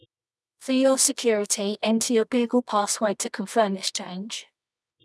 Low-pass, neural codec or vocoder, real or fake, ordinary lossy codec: none; codec, 24 kHz, 0.9 kbps, WavTokenizer, medium music audio release; fake; none